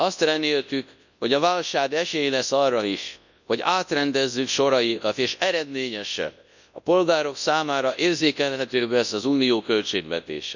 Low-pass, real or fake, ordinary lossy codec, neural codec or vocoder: 7.2 kHz; fake; none; codec, 24 kHz, 0.9 kbps, WavTokenizer, large speech release